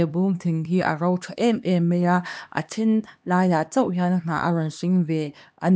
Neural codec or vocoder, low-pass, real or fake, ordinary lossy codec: codec, 16 kHz, 2 kbps, X-Codec, HuBERT features, trained on LibriSpeech; none; fake; none